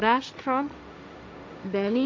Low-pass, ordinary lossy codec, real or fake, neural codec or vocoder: none; none; fake; codec, 16 kHz, 1.1 kbps, Voila-Tokenizer